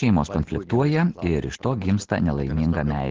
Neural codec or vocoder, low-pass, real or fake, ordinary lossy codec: none; 7.2 kHz; real; Opus, 16 kbps